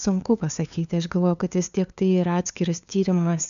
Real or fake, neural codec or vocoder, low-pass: fake; codec, 16 kHz, 2 kbps, FunCodec, trained on LibriTTS, 25 frames a second; 7.2 kHz